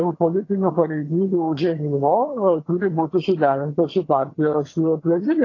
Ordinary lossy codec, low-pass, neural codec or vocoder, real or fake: AAC, 32 kbps; 7.2 kHz; vocoder, 22.05 kHz, 80 mel bands, HiFi-GAN; fake